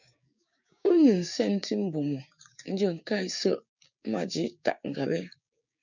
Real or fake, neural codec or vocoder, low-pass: fake; codec, 16 kHz, 6 kbps, DAC; 7.2 kHz